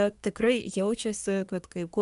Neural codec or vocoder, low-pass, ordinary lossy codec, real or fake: codec, 24 kHz, 1 kbps, SNAC; 10.8 kHz; MP3, 96 kbps; fake